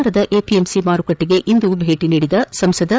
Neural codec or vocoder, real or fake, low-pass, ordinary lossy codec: codec, 16 kHz, 8 kbps, FreqCodec, larger model; fake; none; none